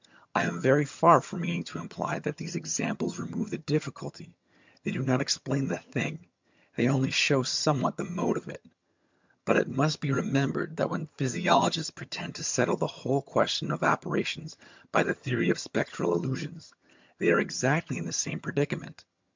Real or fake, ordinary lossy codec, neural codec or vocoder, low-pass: fake; MP3, 64 kbps; vocoder, 22.05 kHz, 80 mel bands, HiFi-GAN; 7.2 kHz